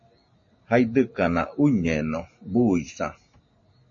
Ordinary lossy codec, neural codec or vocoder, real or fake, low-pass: MP3, 32 kbps; none; real; 7.2 kHz